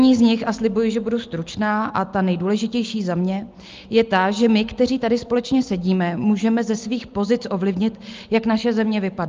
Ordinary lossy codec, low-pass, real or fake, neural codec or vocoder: Opus, 32 kbps; 7.2 kHz; real; none